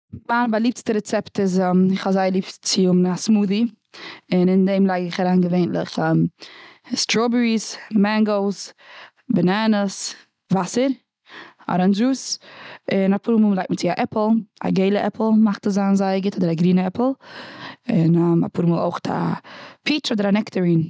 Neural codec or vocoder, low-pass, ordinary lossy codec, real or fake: none; none; none; real